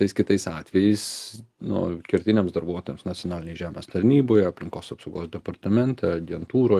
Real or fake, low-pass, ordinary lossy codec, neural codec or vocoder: real; 14.4 kHz; Opus, 32 kbps; none